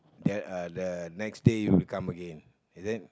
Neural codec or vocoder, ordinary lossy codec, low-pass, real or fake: none; none; none; real